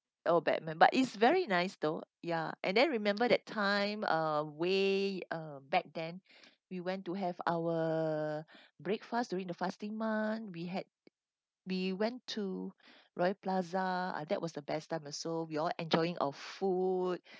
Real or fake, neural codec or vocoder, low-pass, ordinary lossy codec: real; none; none; none